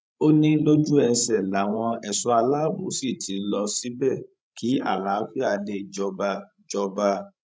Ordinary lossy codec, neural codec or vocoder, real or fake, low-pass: none; codec, 16 kHz, 16 kbps, FreqCodec, larger model; fake; none